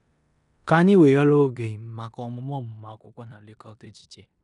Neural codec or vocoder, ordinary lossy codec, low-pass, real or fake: codec, 16 kHz in and 24 kHz out, 0.9 kbps, LongCat-Audio-Codec, four codebook decoder; none; 10.8 kHz; fake